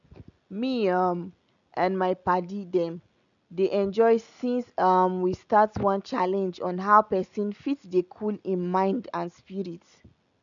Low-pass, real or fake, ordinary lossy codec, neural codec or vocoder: 7.2 kHz; real; none; none